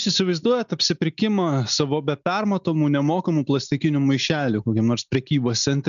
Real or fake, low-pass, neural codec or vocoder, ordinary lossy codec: real; 7.2 kHz; none; MP3, 96 kbps